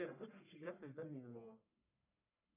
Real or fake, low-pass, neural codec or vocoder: fake; 3.6 kHz; codec, 44.1 kHz, 1.7 kbps, Pupu-Codec